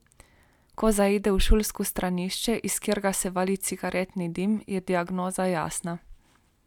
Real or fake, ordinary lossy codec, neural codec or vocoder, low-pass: real; none; none; 19.8 kHz